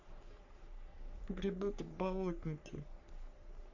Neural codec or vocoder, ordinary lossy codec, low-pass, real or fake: codec, 44.1 kHz, 3.4 kbps, Pupu-Codec; none; 7.2 kHz; fake